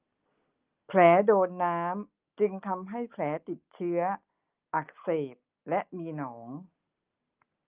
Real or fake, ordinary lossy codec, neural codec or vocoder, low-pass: real; Opus, 32 kbps; none; 3.6 kHz